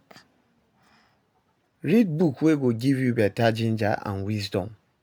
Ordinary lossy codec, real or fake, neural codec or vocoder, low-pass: none; real; none; none